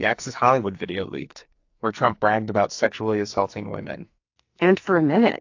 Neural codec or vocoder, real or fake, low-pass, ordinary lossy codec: codec, 44.1 kHz, 2.6 kbps, SNAC; fake; 7.2 kHz; AAC, 48 kbps